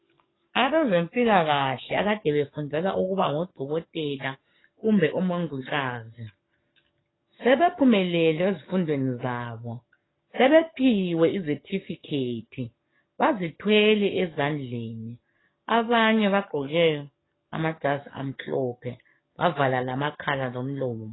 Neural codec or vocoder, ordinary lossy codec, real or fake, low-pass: codec, 44.1 kHz, 7.8 kbps, DAC; AAC, 16 kbps; fake; 7.2 kHz